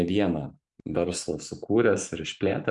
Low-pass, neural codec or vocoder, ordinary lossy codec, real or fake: 10.8 kHz; vocoder, 44.1 kHz, 128 mel bands every 512 samples, BigVGAN v2; MP3, 64 kbps; fake